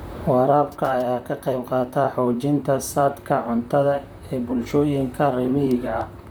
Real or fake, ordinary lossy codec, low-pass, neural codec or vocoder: fake; none; none; vocoder, 44.1 kHz, 128 mel bands, Pupu-Vocoder